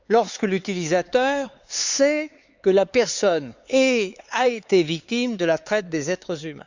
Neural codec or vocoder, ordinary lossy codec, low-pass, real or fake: codec, 16 kHz, 4 kbps, X-Codec, HuBERT features, trained on LibriSpeech; Opus, 64 kbps; 7.2 kHz; fake